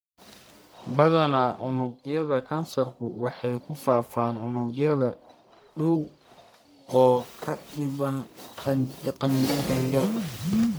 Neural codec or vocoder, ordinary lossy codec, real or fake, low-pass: codec, 44.1 kHz, 1.7 kbps, Pupu-Codec; none; fake; none